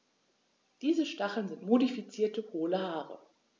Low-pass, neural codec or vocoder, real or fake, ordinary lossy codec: none; none; real; none